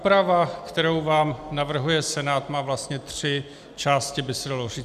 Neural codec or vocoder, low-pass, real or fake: none; 14.4 kHz; real